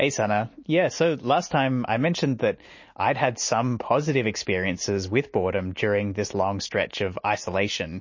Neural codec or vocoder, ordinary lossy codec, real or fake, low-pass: none; MP3, 32 kbps; real; 7.2 kHz